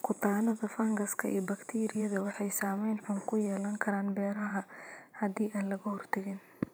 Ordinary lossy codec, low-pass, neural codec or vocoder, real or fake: none; none; vocoder, 44.1 kHz, 128 mel bands every 512 samples, BigVGAN v2; fake